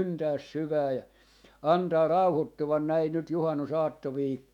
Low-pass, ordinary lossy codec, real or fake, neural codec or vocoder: 19.8 kHz; none; fake; autoencoder, 48 kHz, 128 numbers a frame, DAC-VAE, trained on Japanese speech